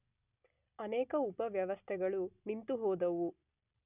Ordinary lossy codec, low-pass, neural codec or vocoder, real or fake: none; 3.6 kHz; none; real